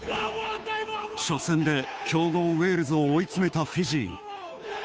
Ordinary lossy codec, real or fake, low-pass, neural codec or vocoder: none; fake; none; codec, 16 kHz, 2 kbps, FunCodec, trained on Chinese and English, 25 frames a second